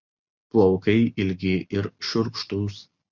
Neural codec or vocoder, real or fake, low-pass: none; real; 7.2 kHz